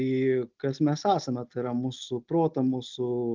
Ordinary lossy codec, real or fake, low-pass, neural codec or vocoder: Opus, 24 kbps; real; 7.2 kHz; none